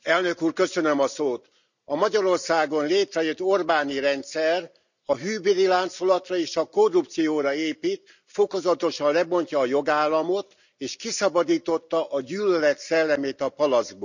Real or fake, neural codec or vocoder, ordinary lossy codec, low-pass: real; none; none; 7.2 kHz